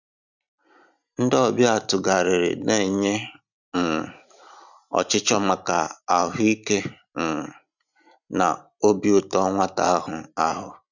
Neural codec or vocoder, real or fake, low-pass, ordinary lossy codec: none; real; 7.2 kHz; none